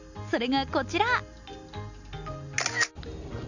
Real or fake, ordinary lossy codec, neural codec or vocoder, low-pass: real; none; none; 7.2 kHz